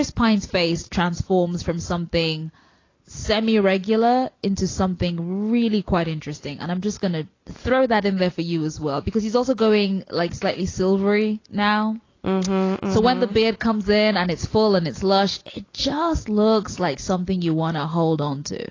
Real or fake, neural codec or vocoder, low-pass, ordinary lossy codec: real; none; 7.2 kHz; AAC, 32 kbps